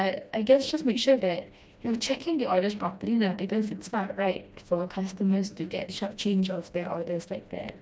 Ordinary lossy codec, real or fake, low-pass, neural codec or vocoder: none; fake; none; codec, 16 kHz, 1 kbps, FreqCodec, smaller model